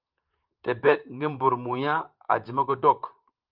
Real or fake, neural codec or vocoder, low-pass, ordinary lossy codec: fake; vocoder, 44.1 kHz, 128 mel bands, Pupu-Vocoder; 5.4 kHz; Opus, 24 kbps